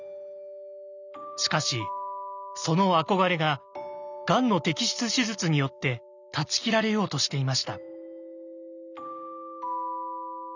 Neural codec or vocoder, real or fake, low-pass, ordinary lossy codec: none; real; 7.2 kHz; none